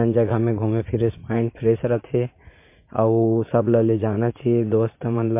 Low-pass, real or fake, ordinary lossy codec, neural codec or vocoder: 3.6 kHz; fake; MP3, 24 kbps; vocoder, 44.1 kHz, 80 mel bands, Vocos